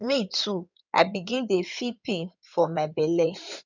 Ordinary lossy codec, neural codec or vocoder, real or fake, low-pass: none; codec, 16 kHz in and 24 kHz out, 2.2 kbps, FireRedTTS-2 codec; fake; 7.2 kHz